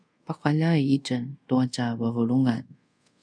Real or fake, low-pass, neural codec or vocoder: fake; 9.9 kHz; codec, 24 kHz, 0.5 kbps, DualCodec